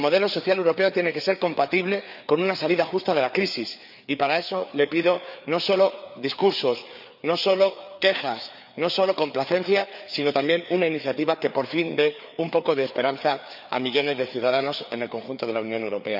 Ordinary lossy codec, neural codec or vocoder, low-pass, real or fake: none; codec, 16 kHz, 4 kbps, FreqCodec, larger model; 5.4 kHz; fake